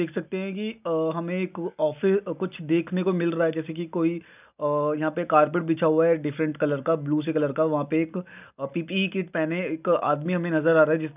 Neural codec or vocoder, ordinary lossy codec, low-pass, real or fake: none; none; 3.6 kHz; real